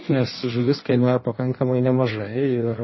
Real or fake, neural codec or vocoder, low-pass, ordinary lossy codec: fake; codec, 16 kHz in and 24 kHz out, 1.1 kbps, FireRedTTS-2 codec; 7.2 kHz; MP3, 24 kbps